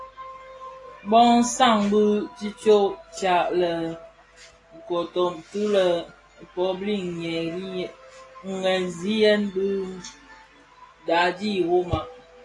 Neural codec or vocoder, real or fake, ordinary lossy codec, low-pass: none; real; AAC, 32 kbps; 10.8 kHz